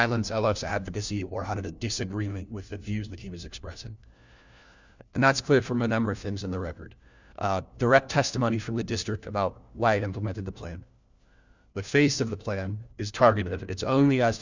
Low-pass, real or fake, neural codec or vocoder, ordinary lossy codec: 7.2 kHz; fake; codec, 16 kHz, 1 kbps, FunCodec, trained on LibriTTS, 50 frames a second; Opus, 64 kbps